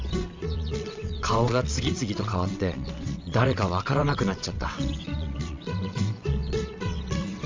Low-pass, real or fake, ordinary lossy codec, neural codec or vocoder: 7.2 kHz; fake; MP3, 64 kbps; vocoder, 22.05 kHz, 80 mel bands, WaveNeXt